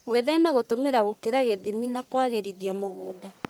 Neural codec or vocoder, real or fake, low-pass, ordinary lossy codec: codec, 44.1 kHz, 1.7 kbps, Pupu-Codec; fake; none; none